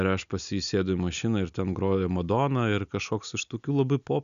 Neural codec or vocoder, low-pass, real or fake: none; 7.2 kHz; real